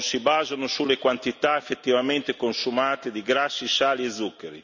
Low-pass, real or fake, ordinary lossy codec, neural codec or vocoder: 7.2 kHz; real; none; none